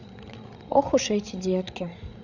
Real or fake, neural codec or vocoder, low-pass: fake; codec, 16 kHz, 16 kbps, FreqCodec, larger model; 7.2 kHz